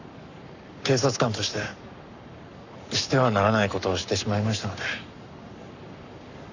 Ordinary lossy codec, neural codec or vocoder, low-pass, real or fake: none; codec, 44.1 kHz, 7.8 kbps, Pupu-Codec; 7.2 kHz; fake